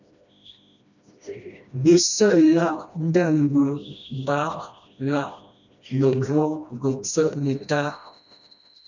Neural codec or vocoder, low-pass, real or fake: codec, 16 kHz, 1 kbps, FreqCodec, smaller model; 7.2 kHz; fake